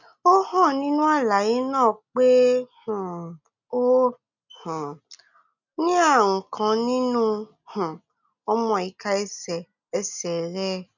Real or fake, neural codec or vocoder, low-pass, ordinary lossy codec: real; none; 7.2 kHz; none